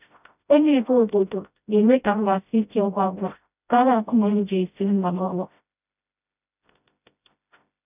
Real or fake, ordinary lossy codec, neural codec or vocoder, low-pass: fake; none; codec, 16 kHz, 0.5 kbps, FreqCodec, smaller model; 3.6 kHz